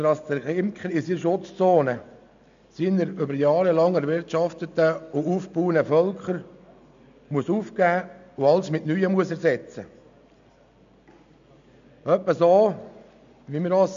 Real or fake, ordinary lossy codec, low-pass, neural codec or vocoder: real; MP3, 64 kbps; 7.2 kHz; none